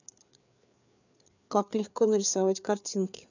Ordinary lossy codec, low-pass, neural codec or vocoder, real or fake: none; 7.2 kHz; codec, 16 kHz, 4 kbps, FreqCodec, larger model; fake